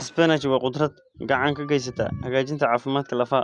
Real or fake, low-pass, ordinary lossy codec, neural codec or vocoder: real; 10.8 kHz; Opus, 64 kbps; none